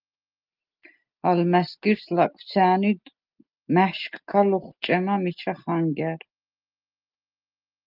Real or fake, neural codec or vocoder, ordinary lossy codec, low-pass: real; none; Opus, 24 kbps; 5.4 kHz